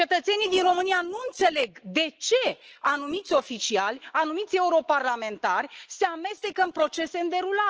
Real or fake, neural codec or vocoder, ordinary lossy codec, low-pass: fake; autoencoder, 48 kHz, 128 numbers a frame, DAC-VAE, trained on Japanese speech; Opus, 16 kbps; 7.2 kHz